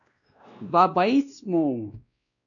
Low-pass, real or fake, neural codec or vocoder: 7.2 kHz; fake; codec, 16 kHz, 1 kbps, X-Codec, WavLM features, trained on Multilingual LibriSpeech